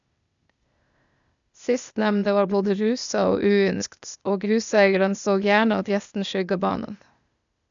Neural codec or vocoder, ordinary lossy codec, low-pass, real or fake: codec, 16 kHz, 0.8 kbps, ZipCodec; none; 7.2 kHz; fake